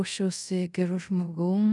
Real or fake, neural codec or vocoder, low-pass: fake; codec, 24 kHz, 0.5 kbps, DualCodec; 10.8 kHz